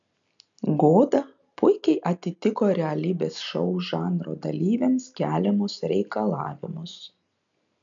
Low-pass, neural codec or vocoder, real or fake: 7.2 kHz; none; real